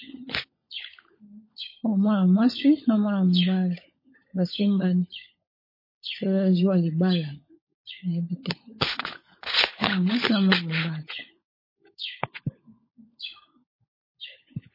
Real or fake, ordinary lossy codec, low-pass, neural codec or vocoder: fake; MP3, 24 kbps; 5.4 kHz; codec, 16 kHz, 16 kbps, FunCodec, trained on LibriTTS, 50 frames a second